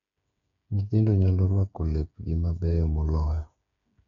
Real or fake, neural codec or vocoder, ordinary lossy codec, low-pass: fake; codec, 16 kHz, 8 kbps, FreqCodec, smaller model; none; 7.2 kHz